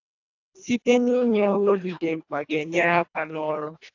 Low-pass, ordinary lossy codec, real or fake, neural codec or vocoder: 7.2 kHz; AAC, 48 kbps; fake; codec, 24 kHz, 1.5 kbps, HILCodec